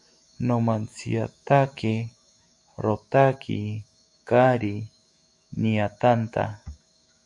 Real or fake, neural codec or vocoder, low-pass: fake; autoencoder, 48 kHz, 128 numbers a frame, DAC-VAE, trained on Japanese speech; 10.8 kHz